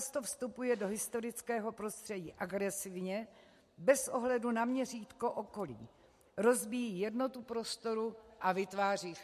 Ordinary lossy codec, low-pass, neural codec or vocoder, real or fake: MP3, 64 kbps; 14.4 kHz; none; real